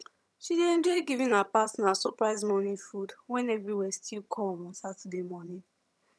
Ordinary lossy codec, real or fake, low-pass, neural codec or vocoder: none; fake; none; vocoder, 22.05 kHz, 80 mel bands, HiFi-GAN